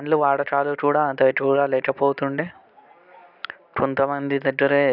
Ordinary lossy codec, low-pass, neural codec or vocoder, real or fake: none; 5.4 kHz; none; real